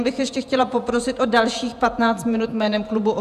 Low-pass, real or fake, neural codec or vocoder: 14.4 kHz; fake; vocoder, 48 kHz, 128 mel bands, Vocos